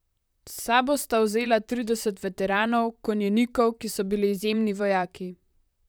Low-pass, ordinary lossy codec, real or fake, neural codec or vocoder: none; none; fake; vocoder, 44.1 kHz, 128 mel bands, Pupu-Vocoder